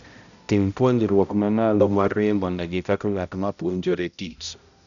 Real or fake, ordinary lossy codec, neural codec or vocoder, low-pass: fake; none; codec, 16 kHz, 0.5 kbps, X-Codec, HuBERT features, trained on balanced general audio; 7.2 kHz